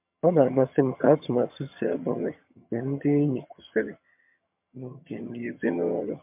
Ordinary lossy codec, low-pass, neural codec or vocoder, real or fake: MP3, 32 kbps; 3.6 kHz; vocoder, 22.05 kHz, 80 mel bands, HiFi-GAN; fake